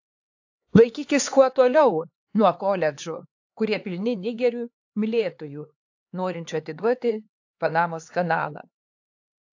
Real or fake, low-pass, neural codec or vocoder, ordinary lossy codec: fake; 7.2 kHz; codec, 16 kHz, 4 kbps, X-Codec, HuBERT features, trained on LibriSpeech; AAC, 48 kbps